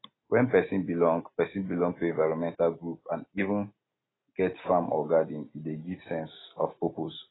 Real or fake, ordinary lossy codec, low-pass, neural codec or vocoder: real; AAC, 16 kbps; 7.2 kHz; none